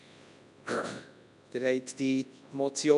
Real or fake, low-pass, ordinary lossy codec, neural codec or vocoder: fake; 10.8 kHz; none; codec, 24 kHz, 0.9 kbps, WavTokenizer, large speech release